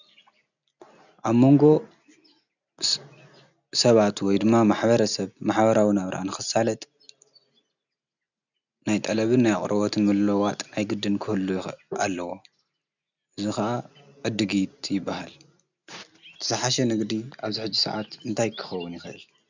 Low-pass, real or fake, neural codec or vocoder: 7.2 kHz; real; none